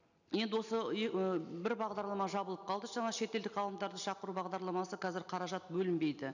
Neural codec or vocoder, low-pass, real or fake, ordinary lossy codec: none; 7.2 kHz; real; none